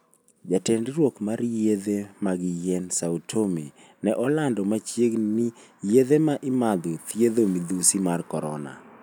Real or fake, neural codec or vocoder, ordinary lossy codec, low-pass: real; none; none; none